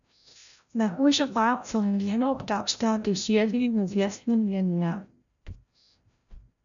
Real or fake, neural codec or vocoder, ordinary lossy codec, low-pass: fake; codec, 16 kHz, 0.5 kbps, FreqCodec, larger model; MP3, 96 kbps; 7.2 kHz